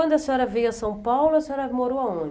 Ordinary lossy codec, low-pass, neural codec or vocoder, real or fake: none; none; none; real